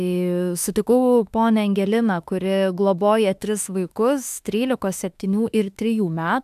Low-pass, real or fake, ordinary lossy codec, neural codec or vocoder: 14.4 kHz; fake; AAC, 96 kbps; autoencoder, 48 kHz, 32 numbers a frame, DAC-VAE, trained on Japanese speech